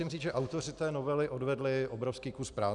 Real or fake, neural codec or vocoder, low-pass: real; none; 10.8 kHz